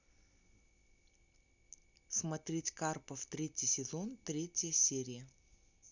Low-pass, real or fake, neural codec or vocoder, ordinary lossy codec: 7.2 kHz; real; none; none